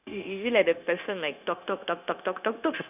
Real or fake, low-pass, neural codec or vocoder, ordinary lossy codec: fake; 3.6 kHz; codec, 16 kHz, 0.9 kbps, LongCat-Audio-Codec; none